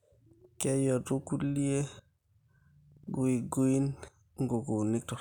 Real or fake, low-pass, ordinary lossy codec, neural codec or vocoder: real; 19.8 kHz; none; none